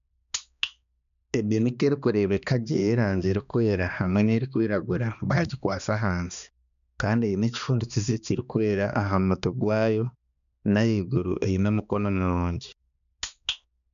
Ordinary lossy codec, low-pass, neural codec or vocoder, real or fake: none; 7.2 kHz; codec, 16 kHz, 2 kbps, X-Codec, HuBERT features, trained on balanced general audio; fake